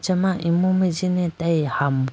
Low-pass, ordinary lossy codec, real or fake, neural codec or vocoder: none; none; real; none